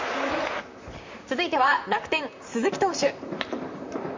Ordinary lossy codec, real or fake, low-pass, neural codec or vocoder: MP3, 64 kbps; fake; 7.2 kHz; vocoder, 44.1 kHz, 128 mel bands, Pupu-Vocoder